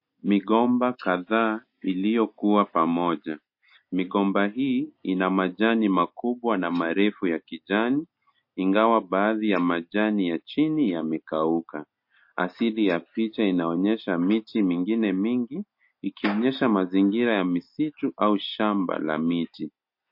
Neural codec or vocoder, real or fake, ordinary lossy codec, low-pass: none; real; MP3, 32 kbps; 5.4 kHz